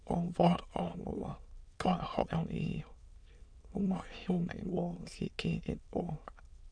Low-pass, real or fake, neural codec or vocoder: 9.9 kHz; fake; autoencoder, 22.05 kHz, a latent of 192 numbers a frame, VITS, trained on many speakers